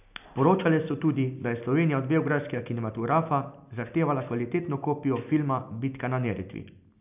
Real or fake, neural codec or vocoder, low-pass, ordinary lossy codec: real; none; 3.6 kHz; none